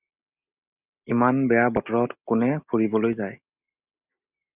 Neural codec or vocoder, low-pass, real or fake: none; 3.6 kHz; real